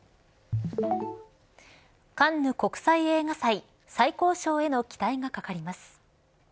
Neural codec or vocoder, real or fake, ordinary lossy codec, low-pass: none; real; none; none